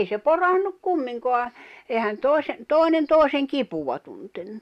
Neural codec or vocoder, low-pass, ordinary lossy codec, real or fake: none; 14.4 kHz; none; real